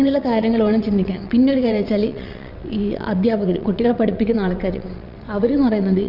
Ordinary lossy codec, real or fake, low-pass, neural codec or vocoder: none; fake; 5.4 kHz; vocoder, 22.05 kHz, 80 mel bands, WaveNeXt